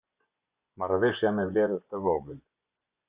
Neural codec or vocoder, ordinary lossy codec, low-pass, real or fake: vocoder, 24 kHz, 100 mel bands, Vocos; Opus, 64 kbps; 3.6 kHz; fake